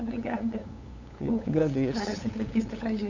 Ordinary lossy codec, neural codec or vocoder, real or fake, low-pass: none; codec, 16 kHz, 8 kbps, FunCodec, trained on LibriTTS, 25 frames a second; fake; 7.2 kHz